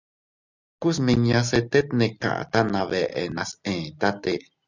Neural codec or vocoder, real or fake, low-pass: none; real; 7.2 kHz